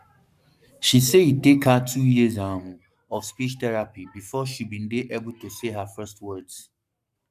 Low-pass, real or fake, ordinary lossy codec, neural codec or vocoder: 14.4 kHz; fake; none; codec, 44.1 kHz, 7.8 kbps, Pupu-Codec